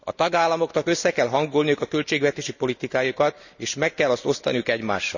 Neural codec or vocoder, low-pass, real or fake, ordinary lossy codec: none; 7.2 kHz; real; none